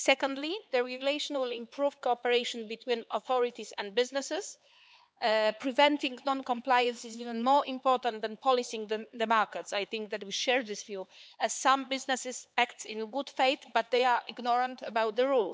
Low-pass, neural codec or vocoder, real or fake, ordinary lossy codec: none; codec, 16 kHz, 4 kbps, X-Codec, HuBERT features, trained on LibriSpeech; fake; none